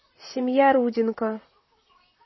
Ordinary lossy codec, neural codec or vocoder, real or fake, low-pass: MP3, 24 kbps; vocoder, 44.1 kHz, 128 mel bands, Pupu-Vocoder; fake; 7.2 kHz